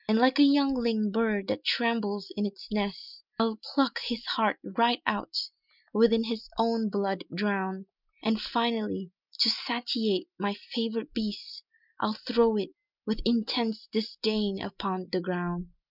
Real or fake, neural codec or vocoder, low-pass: real; none; 5.4 kHz